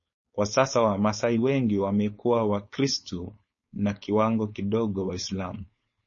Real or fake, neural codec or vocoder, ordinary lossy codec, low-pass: fake; codec, 16 kHz, 4.8 kbps, FACodec; MP3, 32 kbps; 7.2 kHz